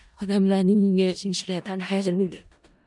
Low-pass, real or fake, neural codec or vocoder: 10.8 kHz; fake; codec, 16 kHz in and 24 kHz out, 0.4 kbps, LongCat-Audio-Codec, four codebook decoder